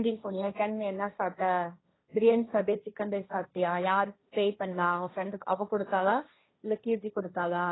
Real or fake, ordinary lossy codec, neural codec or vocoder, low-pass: fake; AAC, 16 kbps; codec, 16 kHz, 1.1 kbps, Voila-Tokenizer; 7.2 kHz